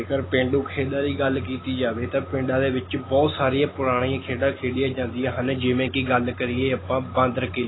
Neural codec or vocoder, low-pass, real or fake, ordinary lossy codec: none; 7.2 kHz; real; AAC, 16 kbps